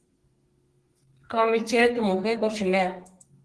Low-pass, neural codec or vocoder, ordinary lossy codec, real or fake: 10.8 kHz; codec, 32 kHz, 1.9 kbps, SNAC; Opus, 16 kbps; fake